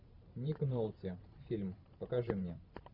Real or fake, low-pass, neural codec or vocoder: fake; 5.4 kHz; vocoder, 44.1 kHz, 128 mel bands every 512 samples, BigVGAN v2